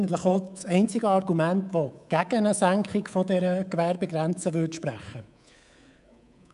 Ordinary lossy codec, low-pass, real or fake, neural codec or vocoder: none; 10.8 kHz; fake; codec, 24 kHz, 3.1 kbps, DualCodec